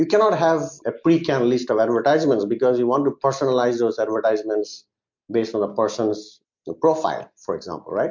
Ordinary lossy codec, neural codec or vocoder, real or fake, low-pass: MP3, 48 kbps; none; real; 7.2 kHz